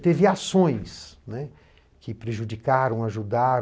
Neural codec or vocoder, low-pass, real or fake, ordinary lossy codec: none; none; real; none